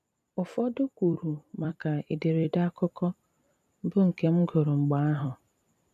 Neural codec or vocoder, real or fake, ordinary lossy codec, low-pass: vocoder, 44.1 kHz, 128 mel bands every 256 samples, BigVGAN v2; fake; none; 14.4 kHz